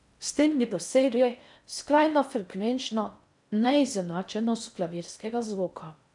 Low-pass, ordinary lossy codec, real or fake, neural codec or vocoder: 10.8 kHz; none; fake; codec, 16 kHz in and 24 kHz out, 0.6 kbps, FocalCodec, streaming, 4096 codes